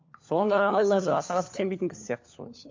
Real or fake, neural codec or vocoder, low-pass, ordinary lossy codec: fake; codec, 16 kHz, 4 kbps, FunCodec, trained on LibriTTS, 50 frames a second; 7.2 kHz; MP3, 48 kbps